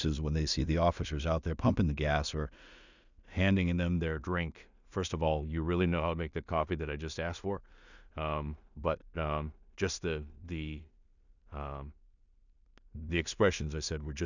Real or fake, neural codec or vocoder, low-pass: fake; codec, 16 kHz in and 24 kHz out, 0.4 kbps, LongCat-Audio-Codec, two codebook decoder; 7.2 kHz